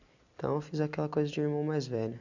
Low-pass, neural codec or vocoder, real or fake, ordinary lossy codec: 7.2 kHz; none; real; none